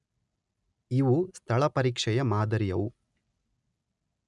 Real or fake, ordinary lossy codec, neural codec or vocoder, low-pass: real; none; none; 10.8 kHz